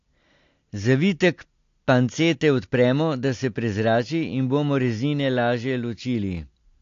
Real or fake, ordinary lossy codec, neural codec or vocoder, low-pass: real; AAC, 48 kbps; none; 7.2 kHz